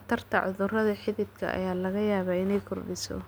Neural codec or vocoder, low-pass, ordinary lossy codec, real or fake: none; none; none; real